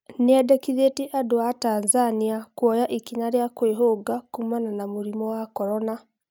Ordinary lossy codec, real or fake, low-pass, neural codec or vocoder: none; real; 19.8 kHz; none